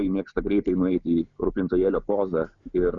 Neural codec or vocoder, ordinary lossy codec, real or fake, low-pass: none; Opus, 64 kbps; real; 7.2 kHz